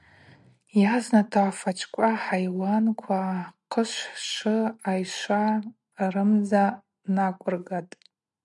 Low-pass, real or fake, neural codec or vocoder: 10.8 kHz; real; none